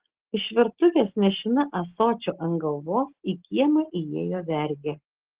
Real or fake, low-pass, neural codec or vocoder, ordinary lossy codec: real; 3.6 kHz; none; Opus, 16 kbps